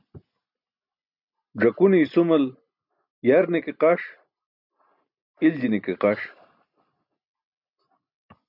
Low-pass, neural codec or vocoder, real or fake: 5.4 kHz; none; real